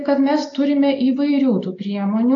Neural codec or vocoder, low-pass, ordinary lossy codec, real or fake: none; 7.2 kHz; AAC, 48 kbps; real